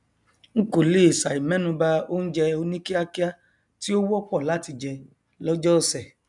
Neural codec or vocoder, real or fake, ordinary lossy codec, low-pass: none; real; none; 10.8 kHz